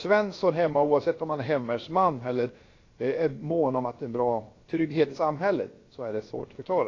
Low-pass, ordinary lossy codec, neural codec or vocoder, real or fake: 7.2 kHz; AAC, 32 kbps; codec, 16 kHz, about 1 kbps, DyCAST, with the encoder's durations; fake